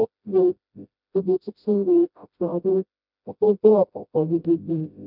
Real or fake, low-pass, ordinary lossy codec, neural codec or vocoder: fake; 5.4 kHz; none; codec, 16 kHz, 0.5 kbps, FreqCodec, smaller model